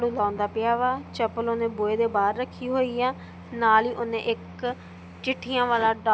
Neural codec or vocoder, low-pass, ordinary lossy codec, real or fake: none; none; none; real